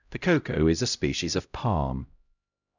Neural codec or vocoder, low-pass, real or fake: codec, 16 kHz, 0.5 kbps, X-Codec, HuBERT features, trained on LibriSpeech; 7.2 kHz; fake